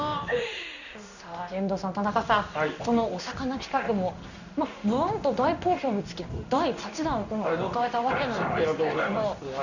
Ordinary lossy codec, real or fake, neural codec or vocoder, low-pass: none; fake; codec, 16 kHz in and 24 kHz out, 1 kbps, XY-Tokenizer; 7.2 kHz